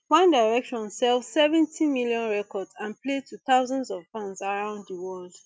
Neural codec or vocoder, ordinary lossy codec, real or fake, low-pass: none; none; real; none